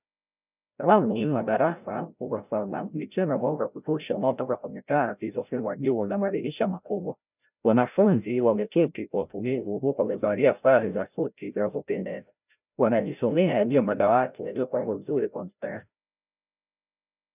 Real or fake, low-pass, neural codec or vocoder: fake; 3.6 kHz; codec, 16 kHz, 0.5 kbps, FreqCodec, larger model